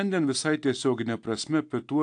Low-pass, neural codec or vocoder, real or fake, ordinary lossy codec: 9.9 kHz; none; real; AAC, 64 kbps